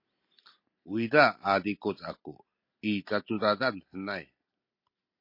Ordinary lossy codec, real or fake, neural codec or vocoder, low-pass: MP3, 32 kbps; real; none; 5.4 kHz